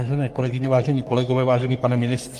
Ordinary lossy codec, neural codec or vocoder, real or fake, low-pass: Opus, 24 kbps; codec, 44.1 kHz, 3.4 kbps, Pupu-Codec; fake; 14.4 kHz